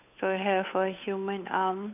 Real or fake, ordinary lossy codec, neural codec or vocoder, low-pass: fake; none; codec, 16 kHz, 8 kbps, FunCodec, trained on Chinese and English, 25 frames a second; 3.6 kHz